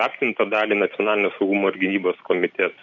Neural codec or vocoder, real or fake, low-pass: none; real; 7.2 kHz